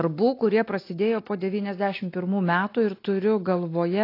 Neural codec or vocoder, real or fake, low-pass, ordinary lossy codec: none; real; 5.4 kHz; AAC, 32 kbps